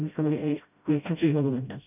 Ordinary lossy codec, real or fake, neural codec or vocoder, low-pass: none; fake; codec, 16 kHz, 0.5 kbps, FreqCodec, smaller model; 3.6 kHz